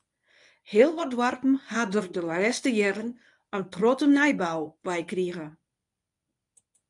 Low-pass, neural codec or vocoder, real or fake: 10.8 kHz; codec, 24 kHz, 0.9 kbps, WavTokenizer, medium speech release version 1; fake